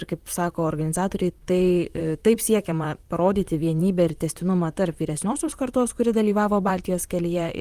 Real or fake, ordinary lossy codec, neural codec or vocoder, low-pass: fake; Opus, 32 kbps; vocoder, 44.1 kHz, 128 mel bands, Pupu-Vocoder; 14.4 kHz